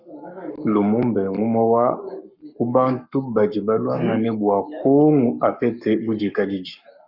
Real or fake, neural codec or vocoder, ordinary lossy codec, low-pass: fake; codec, 44.1 kHz, 7.8 kbps, Pupu-Codec; Opus, 64 kbps; 5.4 kHz